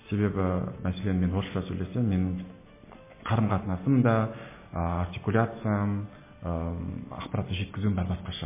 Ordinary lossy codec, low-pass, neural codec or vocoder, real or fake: MP3, 16 kbps; 3.6 kHz; none; real